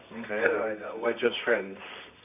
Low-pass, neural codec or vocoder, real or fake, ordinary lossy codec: 3.6 kHz; codec, 24 kHz, 0.9 kbps, WavTokenizer, medium music audio release; fake; none